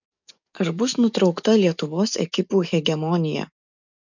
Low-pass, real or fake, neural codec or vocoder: 7.2 kHz; fake; codec, 44.1 kHz, 7.8 kbps, DAC